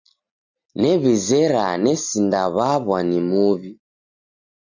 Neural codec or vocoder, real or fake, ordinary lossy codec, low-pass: none; real; Opus, 64 kbps; 7.2 kHz